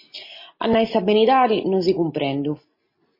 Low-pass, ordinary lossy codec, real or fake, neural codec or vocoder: 5.4 kHz; MP3, 24 kbps; real; none